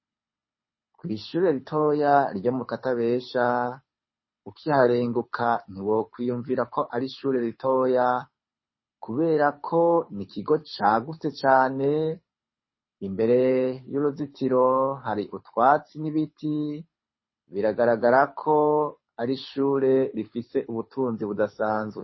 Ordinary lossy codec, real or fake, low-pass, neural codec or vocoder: MP3, 24 kbps; fake; 7.2 kHz; codec, 24 kHz, 6 kbps, HILCodec